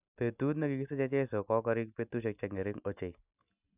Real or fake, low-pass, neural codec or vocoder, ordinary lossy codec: real; 3.6 kHz; none; none